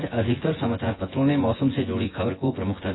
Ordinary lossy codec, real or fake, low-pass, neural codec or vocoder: AAC, 16 kbps; fake; 7.2 kHz; vocoder, 24 kHz, 100 mel bands, Vocos